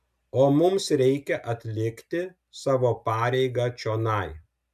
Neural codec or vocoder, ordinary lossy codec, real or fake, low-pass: none; MP3, 96 kbps; real; 14.4 kHz